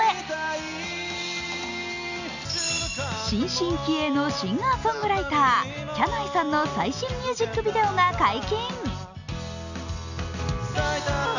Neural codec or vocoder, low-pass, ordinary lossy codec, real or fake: none; 7.2 kHz; none; real